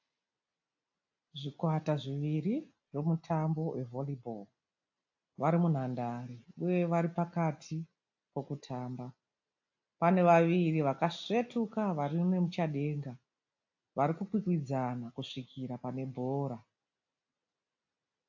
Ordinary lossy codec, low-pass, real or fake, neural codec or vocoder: AAC, 48 kbps; 7.2 kHz; real; none